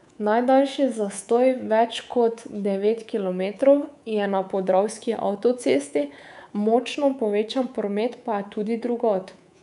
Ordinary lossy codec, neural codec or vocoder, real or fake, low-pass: none; codec, 24 kHz, 3.1 kbps, DualCodec; fake; 10.8 kHz